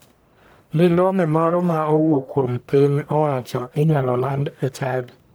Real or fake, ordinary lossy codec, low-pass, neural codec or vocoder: fake; none; none; codec, 44.1 kHz, 1.7 kbps, Pupu-Codec